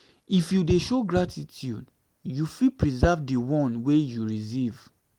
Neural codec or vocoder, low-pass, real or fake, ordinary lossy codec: none; 19.8 kHz; real; Opus, 24 kbps